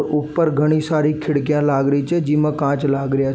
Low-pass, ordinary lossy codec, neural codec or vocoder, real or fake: none; none; none; real